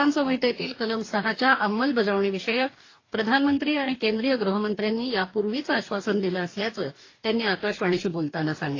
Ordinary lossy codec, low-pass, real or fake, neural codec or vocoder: AAC, 32 kbps; 7.2 kHz; fake; codec, 44.1 kHz, 2.6 kbps, DAC